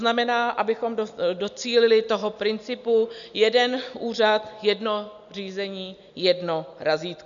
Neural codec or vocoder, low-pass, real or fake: none; 7.2 kHz; real